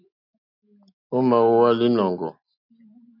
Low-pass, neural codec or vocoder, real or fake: 5.4 kHz; none; real